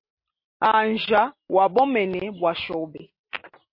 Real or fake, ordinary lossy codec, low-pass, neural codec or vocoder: real; MP3, 32 kbps; 5.4 kHz; none